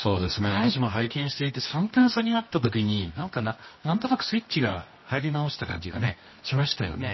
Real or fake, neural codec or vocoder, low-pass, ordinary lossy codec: fake; codec, 24 kHz, 0.9 kbps, WavTokenizer, medium music audio release; 7.2 kHz; MP3, 24 kbps